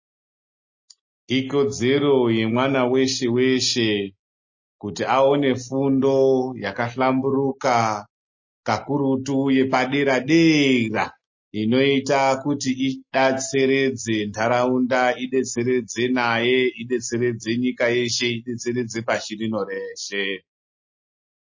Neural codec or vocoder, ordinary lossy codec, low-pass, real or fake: none; MP3, 32 kbps; 7.2 kHz; real